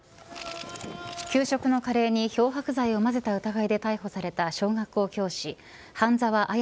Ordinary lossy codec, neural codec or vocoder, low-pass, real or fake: none; none; none; real